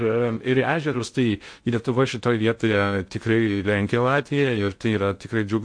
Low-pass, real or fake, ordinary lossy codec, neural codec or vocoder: 9.9 kHz; fake; MP3, 48 kbps; codec, 16 kHz in and 24 kHz out, 0.6 kbps, FocalCodec, streaming, 2048 codes